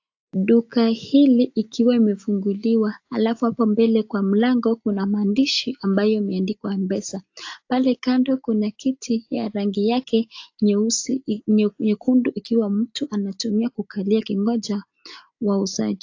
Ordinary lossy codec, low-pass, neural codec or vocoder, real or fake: AAC, 48 kbps; 7.2 kHz; none; real